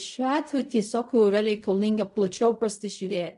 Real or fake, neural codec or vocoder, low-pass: fake; codec, 16 kHz in and 24 kHz out, 0.4 kbps, LongCat-Audio-Codec, fine tuned four codebook decoder; 10.8 kHz